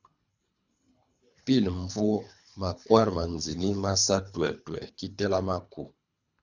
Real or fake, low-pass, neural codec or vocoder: fake; 7.2 kHz; codec, 24 kHz, 3 kbps, HILCodec